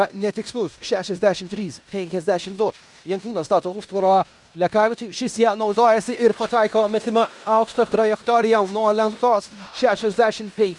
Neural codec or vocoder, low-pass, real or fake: codec, 16 kHz in and 24 kHz out, 0.9 kbps, LongCat-Audio-Codec, fine tuned four codebook decoder; 10.8 kHz; fake